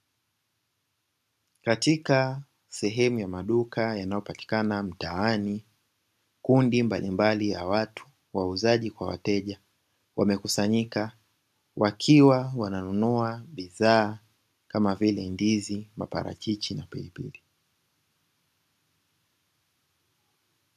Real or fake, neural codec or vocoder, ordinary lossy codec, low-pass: real; none; MP3, 96 kbps; 14.4 kHz